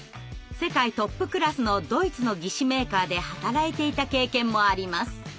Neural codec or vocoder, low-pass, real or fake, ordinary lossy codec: none; none; real; none